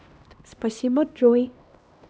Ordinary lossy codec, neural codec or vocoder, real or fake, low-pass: none; codec, 16 kHz, 1 kbps, X-Codec, HuBERT features, trained on LibriSpeech; fake; none